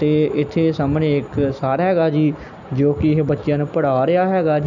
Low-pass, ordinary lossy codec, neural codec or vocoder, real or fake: 7.2 kHz; none; none; real